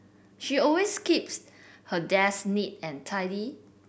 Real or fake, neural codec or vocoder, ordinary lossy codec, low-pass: real; none; none; none